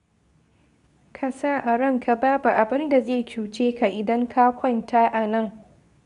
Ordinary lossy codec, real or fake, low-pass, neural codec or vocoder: none; fake; 10.8 kHz; codec, 24 kHz, 0.9 kbps, WavTokenizer, medium speech release version 2